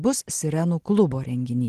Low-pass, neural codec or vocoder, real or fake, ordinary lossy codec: 14.4 kHz; none; real; Opus, 16 kbps